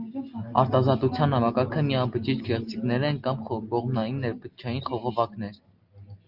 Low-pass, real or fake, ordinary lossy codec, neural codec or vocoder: 5.4 kHz; real; Opus, 32 kbps; none